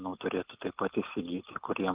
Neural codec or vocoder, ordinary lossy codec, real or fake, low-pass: none; Opus, 32 kbps; real; 3.6 kHz